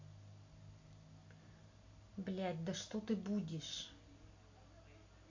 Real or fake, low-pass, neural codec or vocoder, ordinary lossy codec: real; 7.2 kHz; none; MP3, 48 kbps